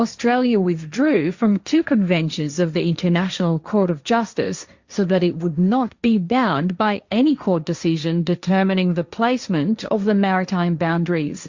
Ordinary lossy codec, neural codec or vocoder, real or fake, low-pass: Opus, 64 kbps; codec, 16 kHz, 1.1 kbps, Voila-Tokenizer; fake; 7.2 kHz